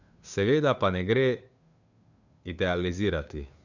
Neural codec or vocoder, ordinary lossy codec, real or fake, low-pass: codec, 16 kHz, 2 kbps, FunCodec, trained on Chinese and English, 25 frames a second; none; fake; 7.2 kHz